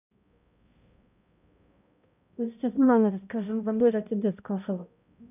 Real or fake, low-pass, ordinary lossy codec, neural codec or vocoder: fake; 3.6 kHz; none; codec, 16 kHz, 0.5 kbps, X-Codec, HuBERT features, trained on balanced general audio